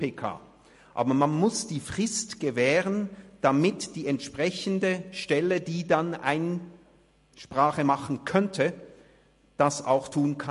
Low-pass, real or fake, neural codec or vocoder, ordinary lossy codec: 14.4 kHz; real; none; MP3, 48 kbps